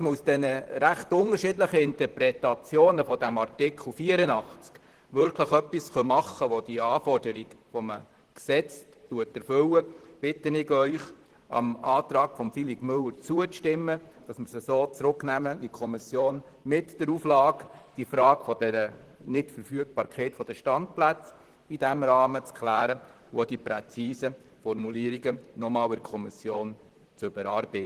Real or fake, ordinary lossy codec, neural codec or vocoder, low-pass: fake; Opus, 16 kbps; vocoder, 44.1 kHz, 128 mel bands, Pupu-Vocoder; 14.4 kHz